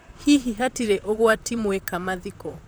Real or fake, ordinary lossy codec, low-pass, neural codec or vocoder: fake; none; none; vocoder, 44.1 kHz, 128 mel bands, Pupu-Vocoder